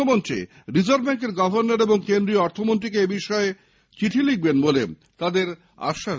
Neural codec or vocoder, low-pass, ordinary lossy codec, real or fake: none; none; none; real